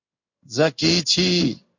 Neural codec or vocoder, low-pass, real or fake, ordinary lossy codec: codec, 16 kHz in and 24 kHz out, 1 kbps, XY-Tokenizer; 7.2 kHz; fake; MP3, 48 kbps